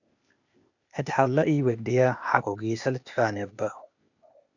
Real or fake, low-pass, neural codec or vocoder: fake; 7.2 kHz; codec, 16 kHz, 0.8 kbps, ZipCodec